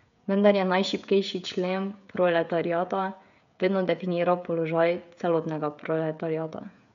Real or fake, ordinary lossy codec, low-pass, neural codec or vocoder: fake; MP3, 64 kbps; 7.2 kHz; codec, 16 kHz, 16 kbps, FreqCodec, smaller model